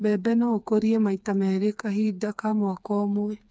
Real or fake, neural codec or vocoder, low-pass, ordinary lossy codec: fake; codec, 16 kHz, 4 kbps, FreqCodec, smaller model; none; none